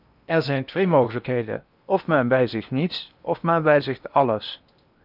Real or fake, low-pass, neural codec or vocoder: fake; 5.4 kHz; codec, 16 kHz in and 24 kHz out, 0.8 kbps, FocalCodec, streaming, 65536 codes